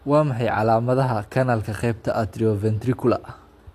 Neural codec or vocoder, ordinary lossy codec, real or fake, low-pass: none; AAC, 64 kbps; real; 14.4 kHz